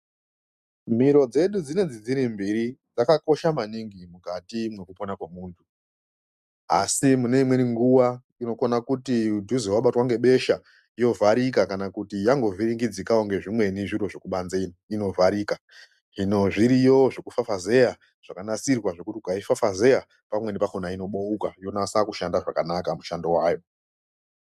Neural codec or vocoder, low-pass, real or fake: none; 14.4 kHz; real